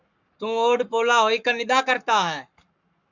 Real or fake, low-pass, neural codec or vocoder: fake; 7.2 kHz; codec, 44.1 kHz, 7.8 kbps, Pupu-Codec